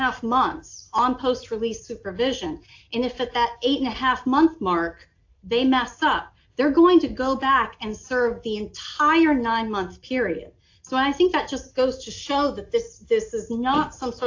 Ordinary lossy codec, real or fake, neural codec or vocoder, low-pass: AAC, 48 kbps; real; none; 7.2 kHz